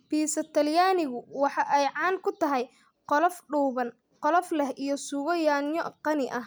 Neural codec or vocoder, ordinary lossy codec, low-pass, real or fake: none; none; none; real